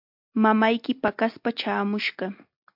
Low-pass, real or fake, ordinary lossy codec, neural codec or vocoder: 5.4 kHz; real; MP3, 48 kbps; none